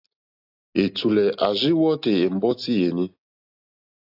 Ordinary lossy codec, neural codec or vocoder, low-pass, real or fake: AAC, 48 kbps; none; 5.4 kHz; real